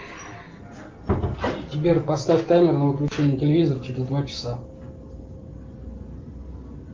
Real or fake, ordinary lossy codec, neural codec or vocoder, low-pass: real; Opus, 32 kbps; none; 7.2 kHz